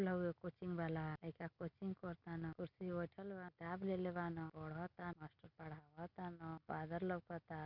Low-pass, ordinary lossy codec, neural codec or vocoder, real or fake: 5.4 kHz; none; none; real